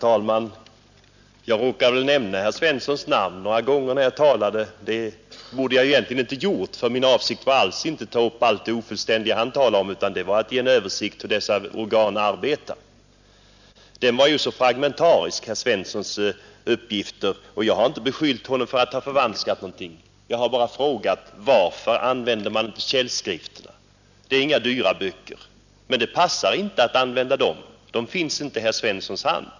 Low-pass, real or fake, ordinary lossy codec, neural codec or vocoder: 7.2 kHz; real; none; none